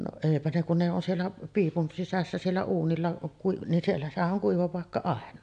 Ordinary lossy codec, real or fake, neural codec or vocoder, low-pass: AAC, 64 kbps; real; none; 9.9 kHz